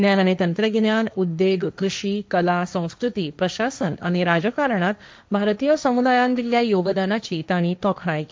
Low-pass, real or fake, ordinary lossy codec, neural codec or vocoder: none; fake; none; codec, 16 kHz, 1.1 kbps, Voila-Tokenizer